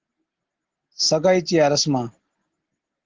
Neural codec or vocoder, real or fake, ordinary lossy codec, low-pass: none; real; Opus, 16 kbps; 7.2 kHz